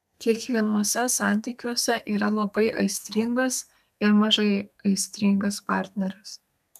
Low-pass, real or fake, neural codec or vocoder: 14.4 kHz; fake; codec, 32 kHz, 1.9 kbps, SNAC